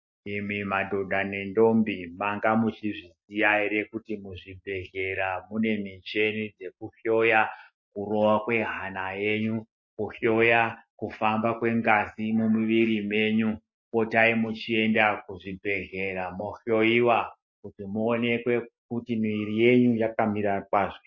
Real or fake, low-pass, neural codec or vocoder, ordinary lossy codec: real; 7.2 kHz; none; MP3, 32 kbps